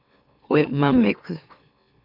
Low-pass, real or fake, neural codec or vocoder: 5.4 kHz; fake; autoencoder, 44.1 kHz, a latent of 192 numbers a frame, MeloTTS